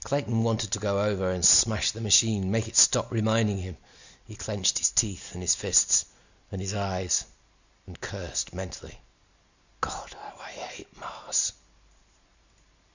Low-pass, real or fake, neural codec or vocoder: 7.2 kHz; real; none